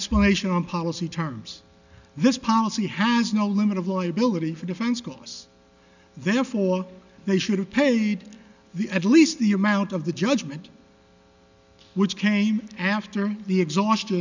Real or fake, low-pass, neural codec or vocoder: real; 7.2 kHz; none